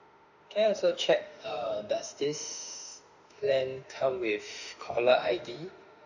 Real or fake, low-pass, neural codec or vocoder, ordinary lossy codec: fake; 7.2 kHz; autoencoder, 48 kHz, 32 numbers a frame, DAC-VAE, trained on Japanese speech; none